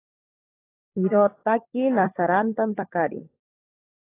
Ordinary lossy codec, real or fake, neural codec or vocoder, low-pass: AAC, 16 kbps; fake; codec, 16 kHz, 16 kbps, FunCodec, trained on LibriTTS, 50 frames a second; 3.6 kHz